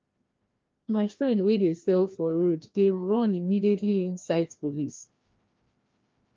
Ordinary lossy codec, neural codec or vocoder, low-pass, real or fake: Opus, 24 kbps; codec, 16 kHz, 1 kbps, FreqCodec, larger model; 7.2 kHz; fake